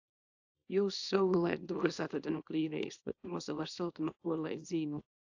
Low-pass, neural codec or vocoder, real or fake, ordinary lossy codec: 7.2 kHz; codec, 24 kHz, 0.9 kbps, WavTokenizer, small release; fake; AAC, 48 kbps